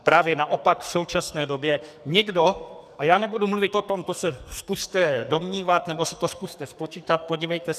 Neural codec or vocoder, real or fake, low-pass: codec, 44.1 kHz, 2.6 kbps, SNAC; fake; 14.4 kHz